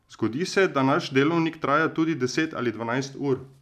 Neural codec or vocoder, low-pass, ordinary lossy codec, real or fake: none; 14.4 kHz; none; real